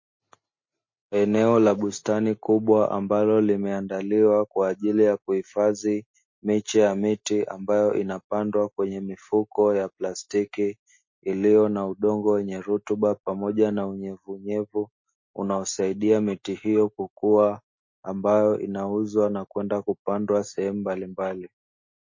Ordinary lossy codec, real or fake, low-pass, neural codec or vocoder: MP3, 32 kbps; real; 7.2 kHz; none